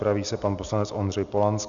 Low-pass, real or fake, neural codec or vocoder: 7.2 kHz; real; none